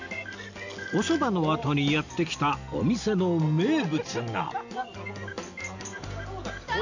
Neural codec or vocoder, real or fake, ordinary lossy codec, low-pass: none; real; none; 7.2 kHz